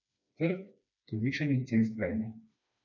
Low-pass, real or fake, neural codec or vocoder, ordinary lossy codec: 7.2 kHz; fake; codec, 16 kHz, 2 kbps, FreqCodec, smaller model; none